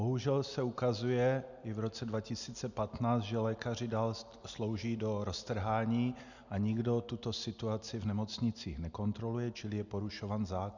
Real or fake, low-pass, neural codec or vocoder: real; 7.2 kHz; none